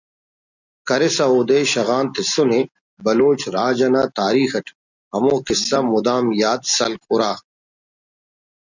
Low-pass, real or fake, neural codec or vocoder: 7.2 kHz; real; none